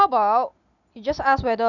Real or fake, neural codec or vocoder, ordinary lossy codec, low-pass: real; none; none; 7.2 kHz